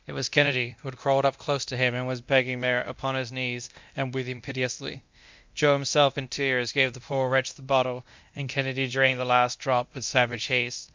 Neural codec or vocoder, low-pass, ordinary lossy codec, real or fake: codec, 24 kHz, 0.9 kbps, DualCodec; 7.2 kHz; MP3, 64 kbps; fake